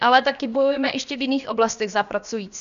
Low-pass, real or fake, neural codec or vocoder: 7.2 kHz; fake; codec, 16 kHz, about 1 kbps, DyCAST, with the encoder's durations